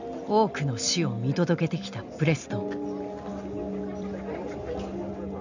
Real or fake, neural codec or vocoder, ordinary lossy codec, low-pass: real; none; none; 7.2 kHz